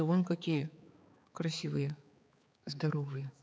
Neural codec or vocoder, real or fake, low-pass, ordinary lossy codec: codec, 16 kHz, 4 kbps, X-Codec, HuBERT features, trained on balanced general audio; fake; none; none